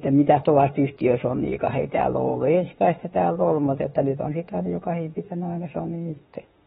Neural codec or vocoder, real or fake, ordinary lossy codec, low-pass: autoencoder, 48 kHz, 32 numbers a frame, DAC-VAE, trained on Japanese speech; fake; AAC, 16 kbps; 19.8 kHz